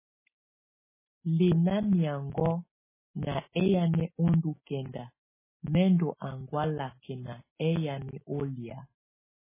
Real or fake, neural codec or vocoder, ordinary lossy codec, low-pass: real; none; MP3, 16 kbps; 3.6 kHz